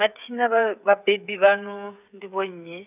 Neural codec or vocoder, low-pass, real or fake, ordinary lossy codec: codec, 16 kHz, 8 kbps, FreqCodec, smaller model; 3.6 kHz; fake; none